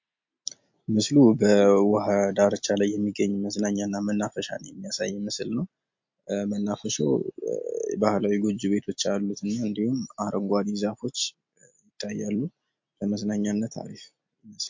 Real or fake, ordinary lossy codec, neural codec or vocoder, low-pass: real; MP3, 48 kbps; none; 7.2 kHz